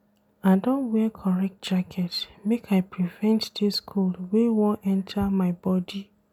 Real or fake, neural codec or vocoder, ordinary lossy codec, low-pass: real; none; none; 19.8 kHz